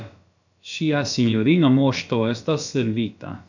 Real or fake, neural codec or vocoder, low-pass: fake; codec, 16 kHz, about 1 kbps, DyCAST, with the encoder's durations; 7.2 kHz